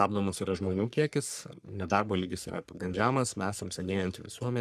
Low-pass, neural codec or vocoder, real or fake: 14.4 kHz; codec, 44.1 kHz, 3.4 kbps, Pupu-Codec; fake